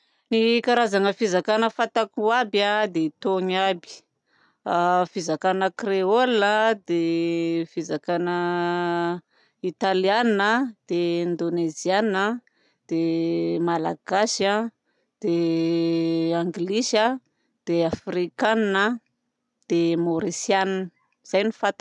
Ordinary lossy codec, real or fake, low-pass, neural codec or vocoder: none; real; 10.8 kHz; none